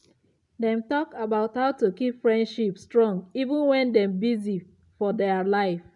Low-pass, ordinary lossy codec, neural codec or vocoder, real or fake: 10.8 kHz; none; none; real